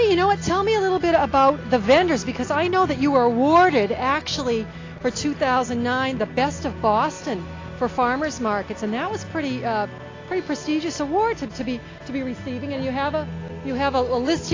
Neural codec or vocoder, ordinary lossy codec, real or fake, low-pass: none; AAC, 32 kbps; real; 7.2 kHz